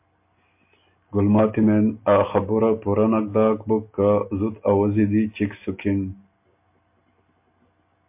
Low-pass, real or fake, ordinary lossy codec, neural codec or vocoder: 3.6 kHz; real; MP3, 24 kbps; none